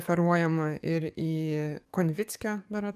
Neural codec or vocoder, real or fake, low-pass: codec, 44.1 kHz, 7.8 kbps, DAC; fake; 14.4 kHz